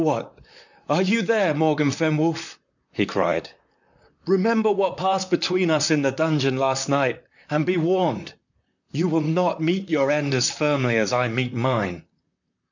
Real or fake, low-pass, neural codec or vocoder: fake; 7.2 kHz; vocoder, 44.1 kHz, 128 mel bands, Pupu-Vocoder